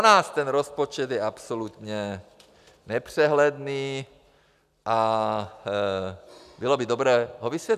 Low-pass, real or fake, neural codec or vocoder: 14.4 kHz; real; none